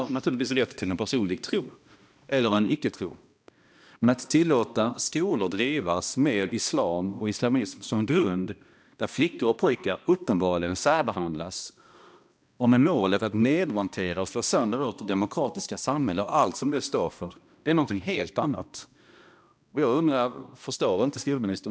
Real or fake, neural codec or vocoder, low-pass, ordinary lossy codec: fake; codec, 16 kHz, 1 kbps, X-Codec, HuBERT features, trained on balanced general audio; none; none